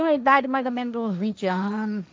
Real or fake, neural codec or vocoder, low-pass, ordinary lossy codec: fake; codec, 16 kHz, 1.1 kbps, Voila-Tokenizer; none; none